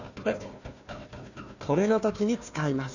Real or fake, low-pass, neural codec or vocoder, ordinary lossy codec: fake; 7.2 kHz; codec, 16 kHz, 1 kbps, FunCodec, trained on Chinese and English, 50 frames a second; none